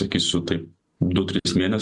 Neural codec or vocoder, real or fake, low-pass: none; real; 10.8 kHz